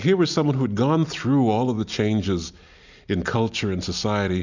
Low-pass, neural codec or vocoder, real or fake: 7.2 kHz; none; real